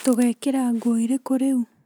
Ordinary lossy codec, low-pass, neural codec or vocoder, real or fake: none; none; none; real